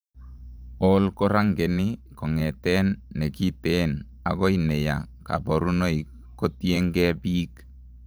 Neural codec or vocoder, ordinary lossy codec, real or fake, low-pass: vocoder, 44.1 kHz, 128 mel bands every 512 samples, BigVGAN v2; none; fake; none